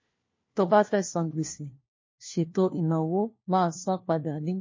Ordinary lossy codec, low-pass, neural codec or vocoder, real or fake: MP3, 32 kbps; 7.2 kHz; codec, 16 kHz, 1 kbps, FunCodec, trained on LibriTTS, 50 frames a second; fake